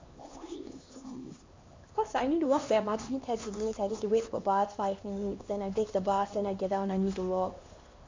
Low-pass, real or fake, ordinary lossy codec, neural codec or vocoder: 7.2 kHz; fake; MP3, 48 kbps; codec, 16 kHz, 2 kbps, X-Codec, HuBERT features, trained on LibriSpeech